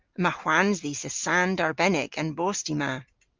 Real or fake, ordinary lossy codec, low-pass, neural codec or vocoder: real; Opus, 32 kbps; 7.2 kHz; none